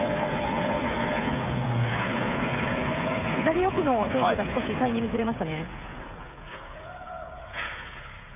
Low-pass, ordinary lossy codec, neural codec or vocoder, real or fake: 3.6 kHz; none; codec, 16 kHz, 8 kbps, FreqCodec, smaller model; fake